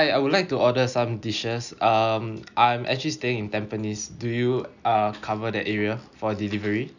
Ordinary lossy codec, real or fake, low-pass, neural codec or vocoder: none; real; 7.2 kHz; none